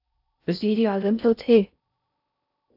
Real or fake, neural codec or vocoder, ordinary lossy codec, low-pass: fake; codec, 16 kHz in and 24 kHz out, 0.6 kbps, FocalCodec, streaming, 4096 codes; AAC, 48 kbps; 5.4 kHz